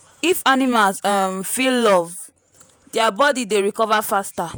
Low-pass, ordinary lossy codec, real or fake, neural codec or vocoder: none; none; fake; vocoder, 48 kHz, 128 mel bands, Vocos